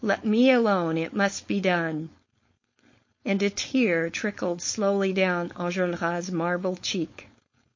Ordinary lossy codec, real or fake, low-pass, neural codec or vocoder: MP3, 32 kbps; fake; 7.2 kHz; codec, 16 kHz, 4.8 kbps, FACodec